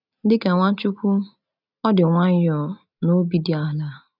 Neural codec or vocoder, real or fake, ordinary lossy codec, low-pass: none; real; none; 5.4 kHz